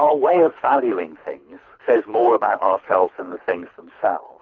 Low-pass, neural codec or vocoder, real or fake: 7.2 kHz; codec, 24 kHz, 3 kbps, HILCodec; fake